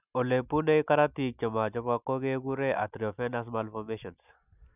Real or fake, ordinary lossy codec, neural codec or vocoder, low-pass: real; none; none; 3.6 kHz